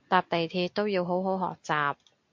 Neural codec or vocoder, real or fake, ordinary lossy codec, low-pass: none; real; MP3, 48 kbps; 7.2 kHz